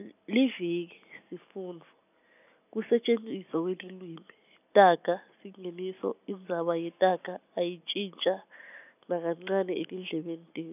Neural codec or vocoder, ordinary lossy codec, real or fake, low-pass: none; none; real; 3.6 kHz